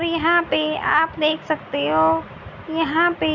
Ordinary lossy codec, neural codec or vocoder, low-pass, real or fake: none; none; 7.2 kHz; real